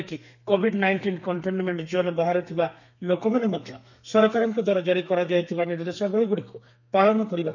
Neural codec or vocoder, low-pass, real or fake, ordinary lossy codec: codec, 32 kHz, 1.9 kbps, SNAC; 7.2 kHz; fake; none